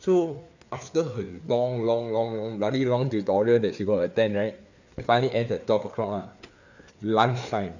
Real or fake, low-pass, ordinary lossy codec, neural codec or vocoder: fake; 7.2 kHz; none; codec, 16 kHz, 4 kbps, FreqCodec, larger model